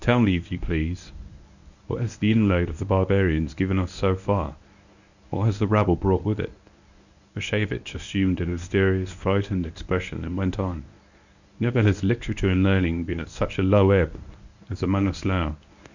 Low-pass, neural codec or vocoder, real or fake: 7.2 kHz; codec, 24 kHz, 0.9 kbps, WavTokenizer, medium speech release version 1; fake